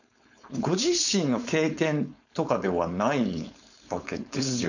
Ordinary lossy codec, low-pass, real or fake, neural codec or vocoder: none; 7.2 kHz; fake; codec, 16 kHz, 4.8 kbps, FACodec